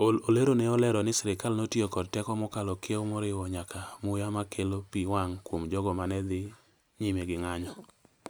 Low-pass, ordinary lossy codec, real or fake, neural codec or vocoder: none; none; real; none